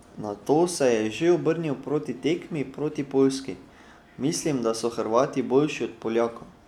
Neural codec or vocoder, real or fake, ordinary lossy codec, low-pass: none; real; none; 19.8 kHz